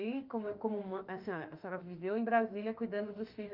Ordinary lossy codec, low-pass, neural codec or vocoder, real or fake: Opus, 24 kbps; 5.4 kHz; autoencoder, 48 kHz, 32 numbers a frame, DAC-VAE, trained on Japanese speech; fake